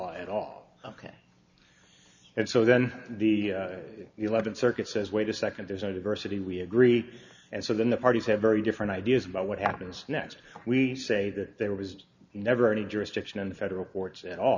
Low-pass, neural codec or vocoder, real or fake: 7.2 kHz; none; real